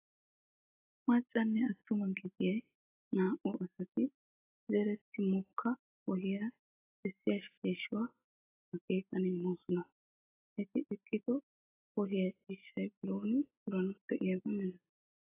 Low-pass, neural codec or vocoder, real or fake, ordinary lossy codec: 3.6 kHz; none; real; AAC, 16 kbps